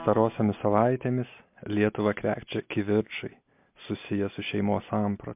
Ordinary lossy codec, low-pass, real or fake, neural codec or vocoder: MP3, 32 kbps; 3.6 kHz; real; none